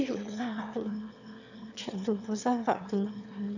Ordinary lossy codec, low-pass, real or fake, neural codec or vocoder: none; 7.2 kHz; fake; autoencoder, 22.05 kHz, a latent of 192 numbers a frame, VITS, trained on one speaker